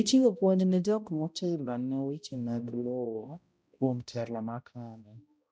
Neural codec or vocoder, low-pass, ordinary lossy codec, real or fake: codec, 16 kHz, 0.5 kbps, X-Codec, HuBERT features, trained on balanced general audio; none; none; fake